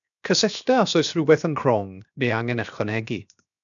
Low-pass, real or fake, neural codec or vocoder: 7.2 kHz; fake; codec, 16 kHz, 0.7 kbps, FocalCodec